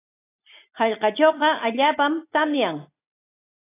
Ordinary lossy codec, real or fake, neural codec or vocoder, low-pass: AAC, 24 kbps; real; none; 3.6 kHz